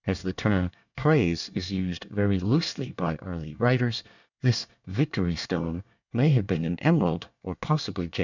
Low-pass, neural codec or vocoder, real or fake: 7.2 kHz; codec, 24 kHz, 1 kbps, SNAC; fake